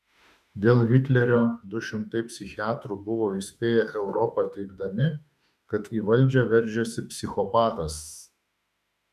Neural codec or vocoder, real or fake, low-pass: autoencoder, 48 kHz, 32 numbers a frame, DAC-VAE, trained on Japanese speech; fake; 14.4 kHz